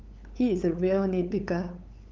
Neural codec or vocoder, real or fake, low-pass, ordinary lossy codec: codec, 16 kHz, 8 kbps, FunCodec, trained on LibriTTS, 25 frames a second; fake; 7.2 kHz; Opus, 24 kbps